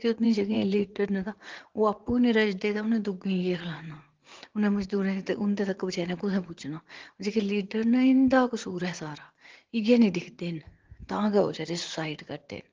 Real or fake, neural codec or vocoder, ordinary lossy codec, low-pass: fake; vocoder, 44.1 kHz, 80 mel bands, Vocos; Opus, 16 kbps; 7.2 kHz